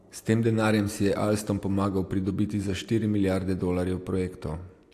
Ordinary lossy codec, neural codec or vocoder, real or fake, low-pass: AAC, 64 kbps; none; real; 14.4 kHz